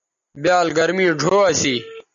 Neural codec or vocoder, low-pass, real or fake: none; 7.2 kHz; real